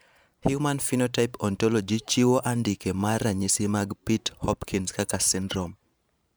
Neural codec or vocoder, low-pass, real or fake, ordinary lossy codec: vocoder, 44.1 kHz, 128 mel bands every 512 samples, BigVGAN v2; none; fake; none